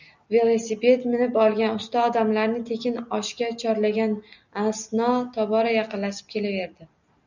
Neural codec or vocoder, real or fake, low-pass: none; real; 7.2 kHz